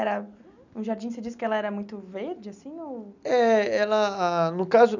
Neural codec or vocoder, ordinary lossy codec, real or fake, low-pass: none; none; real; 7.2 kHz